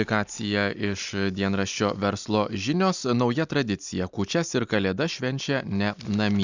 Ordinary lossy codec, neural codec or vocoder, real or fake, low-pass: Opus, 64 kbps; none; real; 7.2 kHz